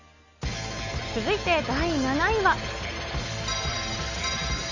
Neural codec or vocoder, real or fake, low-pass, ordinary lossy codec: none; real; 7.2 kHz; none